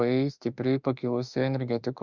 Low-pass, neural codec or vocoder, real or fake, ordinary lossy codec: 7.2 kHz; autoencoder, 48 kHz, 32 numbers a frame, DAC-VAE, trained on Japanese speech; fake; Opus, 64 kbps